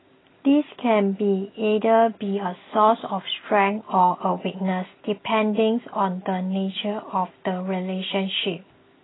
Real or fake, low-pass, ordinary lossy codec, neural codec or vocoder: real; 7.2 kHz; AAC, 16 kbps; none